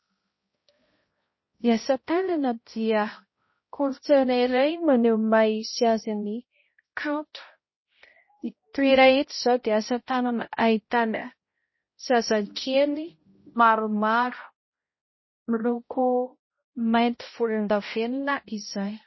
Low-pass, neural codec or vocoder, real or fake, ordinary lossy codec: 7.2 kHz; codec, 16 kHz, 0.5 kbps, X-Codec, HuBERT features, trained on balanced general audio; fake; MP3, 24 kbps